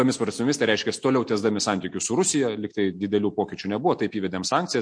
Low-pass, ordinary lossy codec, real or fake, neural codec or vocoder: 9.9 kHz; MP3, 48 kbps; real; none